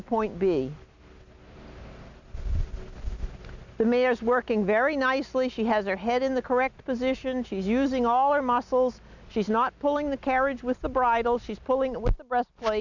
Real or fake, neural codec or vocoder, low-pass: real; none; 7.2 kHz